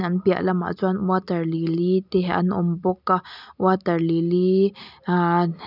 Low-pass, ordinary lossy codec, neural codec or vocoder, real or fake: 5.4 kHz; none; none; real